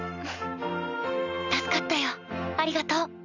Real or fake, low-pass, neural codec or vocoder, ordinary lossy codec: real; 7.2 kHz; none; none